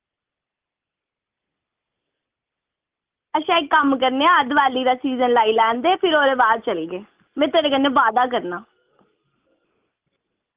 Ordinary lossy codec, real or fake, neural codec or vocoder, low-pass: Opus, 32 kbps; real; none; 3.6 kHz